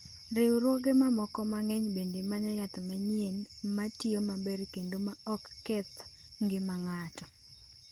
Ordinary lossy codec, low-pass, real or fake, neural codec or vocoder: Opus, 24 kbps; 19.8 kHz; real; none